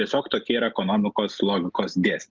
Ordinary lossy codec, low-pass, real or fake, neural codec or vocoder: Opus, 32 kbps; 7.2 kHz; real; none